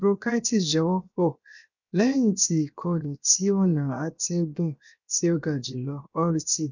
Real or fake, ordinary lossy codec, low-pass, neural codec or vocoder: fake; none; 7.2 kHz; codec, 16 kHz, 0.7 kbps, FocalCodec